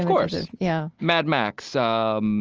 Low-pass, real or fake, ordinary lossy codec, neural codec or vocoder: 7.2 kHz; real; Opus, 24 kbps; none